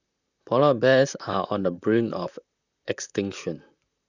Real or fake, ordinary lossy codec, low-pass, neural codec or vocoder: fake; none; 7.2 kHz; vocoder, 44.1 kHz, 128 mel bands, Pupu-Vocoder